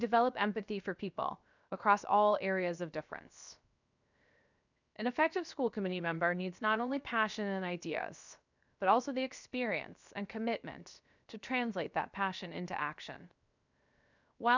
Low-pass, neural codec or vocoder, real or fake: 7.2 kHz; codec, 16 kHz, 0.7 kbps, FocalCodec; fake